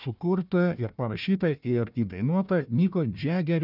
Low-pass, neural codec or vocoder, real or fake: 5.4 kHz; codec, 16 kHz, 1 kbps, FunCodec, trained on Chinese and English, 50 frames a second; fake